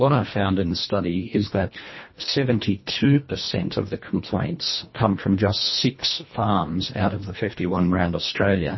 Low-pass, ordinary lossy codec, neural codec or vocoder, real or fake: 7.2 kHz; MP3, 24 kbps; codec, 24 kHz, 1.5 kbps, HILCodec; fake